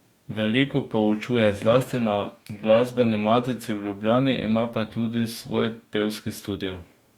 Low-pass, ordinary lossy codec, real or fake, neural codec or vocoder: 19.8 kHz; Opus, 64 kbps; fake; codec, 44.1 kHz, 2.6 kbps, DAC